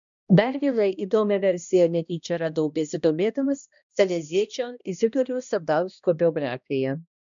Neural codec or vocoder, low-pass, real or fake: codec, 16 kHz, 1 kbps, X-Codec, HuBERT features, trained on balanced general audio; 7.2 kHz; fake